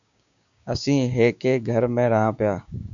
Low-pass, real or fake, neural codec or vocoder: 7.2 kHz; fake; codec, 16 kHz, 6 kbps, DAC